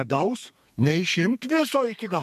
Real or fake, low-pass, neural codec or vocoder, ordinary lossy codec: fake; 14.4 kHz; codec, 32 kHz, 1.9 kbps, SNAC; MP3, 96 kbps